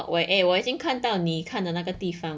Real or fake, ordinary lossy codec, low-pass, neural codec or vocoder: real; none; none; none